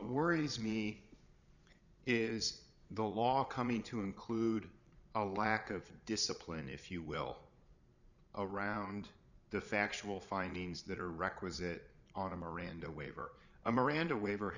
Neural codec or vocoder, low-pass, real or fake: vocoder, 22.05 kHz, 80 mel bands, Vocos; 7.2 kHz; fake